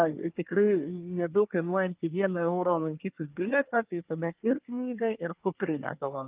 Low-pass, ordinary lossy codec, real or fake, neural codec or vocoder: 3.6 kHz; Opus, 24 kbps; fake; codec, 24 kHz, 1 kbps, SNAC